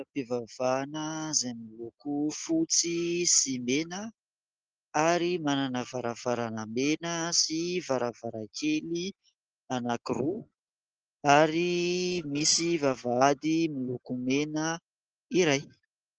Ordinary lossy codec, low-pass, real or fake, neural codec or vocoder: Opus, 16 kbps; 7.2 kHz; real; none